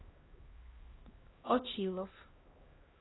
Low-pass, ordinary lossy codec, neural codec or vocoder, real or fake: 7.2 kHz; AAC, 16 kbps; codec, 16 kHz, 1 kbps, X-Codec, HuBERT features, trained on LibriSpeech; fake